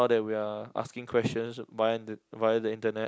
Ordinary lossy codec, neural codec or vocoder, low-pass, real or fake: none; none; none; real